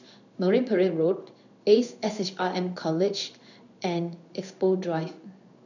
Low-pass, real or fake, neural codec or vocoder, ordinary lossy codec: 7.2 kHz; fake; codec, 16 kHz in and 24 kHz out, 1 kbps, XY-Tokenizer; none